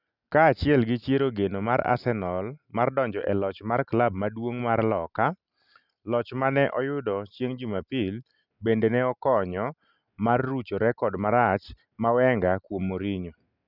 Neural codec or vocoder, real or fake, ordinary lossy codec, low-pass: none; real; none; 5.4 kHz